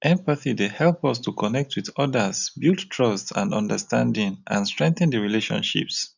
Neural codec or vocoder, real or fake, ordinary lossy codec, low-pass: none; real; none; 7.2 kHz